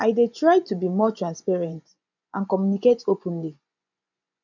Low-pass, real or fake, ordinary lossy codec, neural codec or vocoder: 7.2 kHz; fake; none; vocoder, 24 kHz, 100 mel bands, Vocos